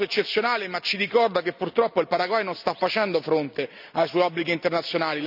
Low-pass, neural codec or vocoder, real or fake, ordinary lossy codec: 5.4 kHz; none; real; none